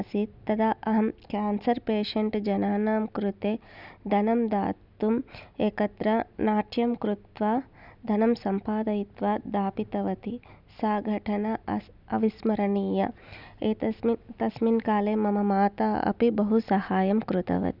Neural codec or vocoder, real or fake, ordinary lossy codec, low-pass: none; real; none; 5.4 kHz